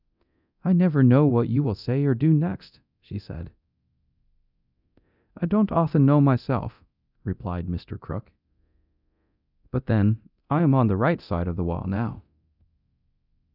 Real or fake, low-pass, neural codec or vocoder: fake; 5.4 kHz; codec, 24 kHz, 0.9 kbps, DualCodec